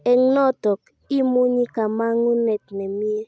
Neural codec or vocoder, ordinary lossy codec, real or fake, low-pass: none; none; real; none